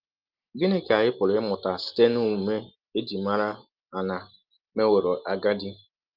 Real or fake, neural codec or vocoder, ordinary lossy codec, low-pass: real; none; Opus, 24 kbps; 5.4 kHz